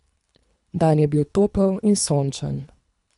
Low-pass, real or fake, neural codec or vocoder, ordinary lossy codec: 10.8 kHz; fake; codec, 24 kHz, 3 kbps, HILCodec; none